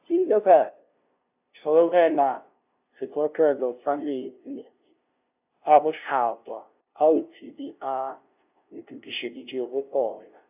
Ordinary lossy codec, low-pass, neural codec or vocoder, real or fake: AAC, 32 kbps; 3.6 kHz; codec, 16 kHz, 0.5 kbps, FunCodec, trained on LibriTTS, 25 frames a second; fake